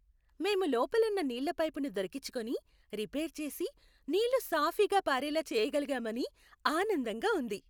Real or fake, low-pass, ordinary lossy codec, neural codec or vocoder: real; none; none; none